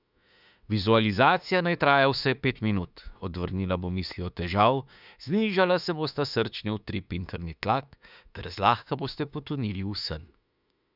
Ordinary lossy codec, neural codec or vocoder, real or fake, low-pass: none; autoencoder, 48 kHz, 32 numbers a frame, DAC-VAE, trained on Japanese speech; fake; 5.4 kHz